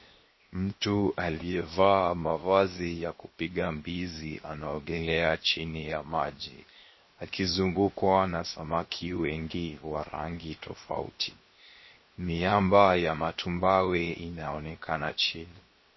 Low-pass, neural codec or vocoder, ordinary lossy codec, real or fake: 7.2 kHz; codec, 16 kHz, 0.7 kbps, FocalCodec; MP3, 24 kbps; fake